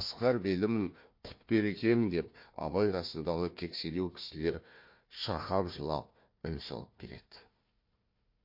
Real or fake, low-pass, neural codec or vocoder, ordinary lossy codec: fake; 5.4 kHz; codec, 16 kHz, 1 kbps, FunCodec, trained on Chinese and English, 50 frames a second; MP3, 32 kbps